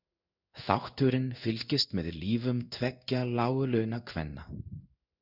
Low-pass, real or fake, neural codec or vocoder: 5.4 kHz; fake; codec, 16 kHz in and 24 kHz out, 1 kbps, XY-Tokenizer